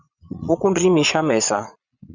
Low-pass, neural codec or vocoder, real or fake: 7.2 kHz; vocoder, 22.05 kHz, 80 mel bands, Vocos; fake